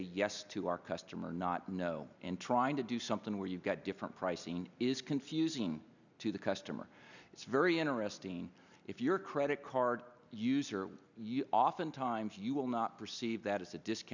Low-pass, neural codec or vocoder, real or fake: 7.2 kHz; none; real